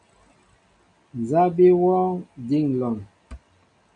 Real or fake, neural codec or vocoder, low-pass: real; none; 9.9 kHz